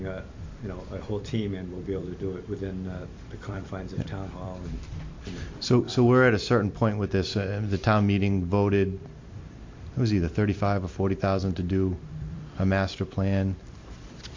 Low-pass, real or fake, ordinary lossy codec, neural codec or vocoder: 7.2 kHz; fake; MP3, 48 kbps; vocoder, 44.1 kHz, 128 mel bands every 256 samples, BigVGAN v2